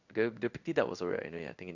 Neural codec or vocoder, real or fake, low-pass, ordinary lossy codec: codec, 16 kHz in and 24 kHz out, 1 kbps, XY-Tokenizer; fake; 7.2 kHz; none